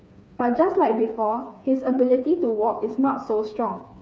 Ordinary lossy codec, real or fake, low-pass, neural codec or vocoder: none; fake; none; codec, 16 kHz, 4 kbps, FreqCodec, smaller model